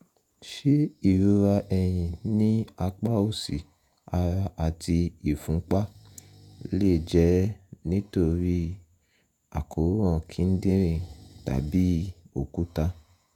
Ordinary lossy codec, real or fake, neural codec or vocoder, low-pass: none; fake; vocoder, 48 kHz, 128 mel bands, Vocos; 19.8 kHz